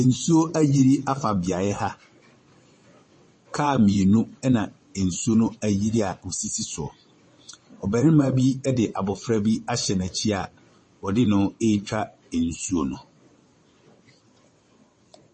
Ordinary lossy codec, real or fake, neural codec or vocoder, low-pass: MP3, 32 kbps; fake; vocoder, 22.05 kHz, 80 mel bands, WaveNeXt; 9.9 kHz